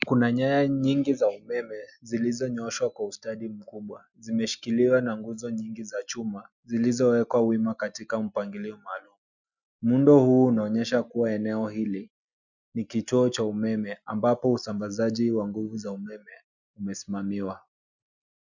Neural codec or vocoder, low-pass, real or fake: none; 7.2 kHz; real